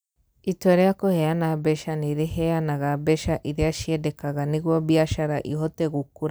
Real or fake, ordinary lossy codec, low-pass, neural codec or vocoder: real; none; none; none